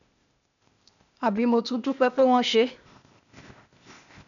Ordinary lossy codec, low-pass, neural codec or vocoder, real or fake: none; 7.2 kHz; codec, 16 kHz, 0.8 kbps, ZipCodec; fake